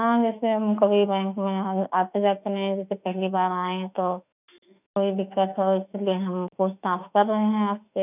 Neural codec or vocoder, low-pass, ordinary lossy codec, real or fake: autoencoder, 48 kHz, 32 numbers a frame, DAC-VAE, trained on Japanese speech; 3.6 kHz; none; fake